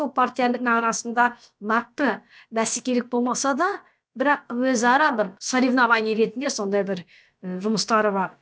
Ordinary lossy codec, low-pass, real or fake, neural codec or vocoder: none; none; fake; codec, 16 kHz, about 1 kbps, DyCAST, with the encoder's durations